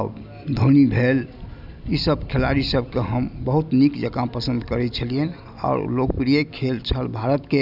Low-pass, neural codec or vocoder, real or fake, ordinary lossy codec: 5.4 kHz; none; real; none